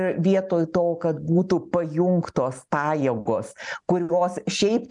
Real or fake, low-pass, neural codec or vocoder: real; 10.8 kHz; none